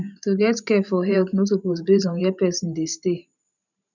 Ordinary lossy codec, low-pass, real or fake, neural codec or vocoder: none; 7.2 kHz; fake; vocoder, 44.1 kHz, 128 mel bands every 512 samples, BigVGAN v2